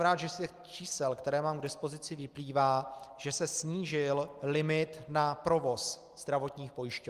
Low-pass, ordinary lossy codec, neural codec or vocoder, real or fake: 14.4 kHz; Opus, 24 kbps; none; real